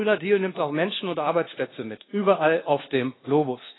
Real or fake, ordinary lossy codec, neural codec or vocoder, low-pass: fake; AAC, 16 kbps; codec, 24 kHz, 1.2 kbps, DualCodec; 7.2 kHz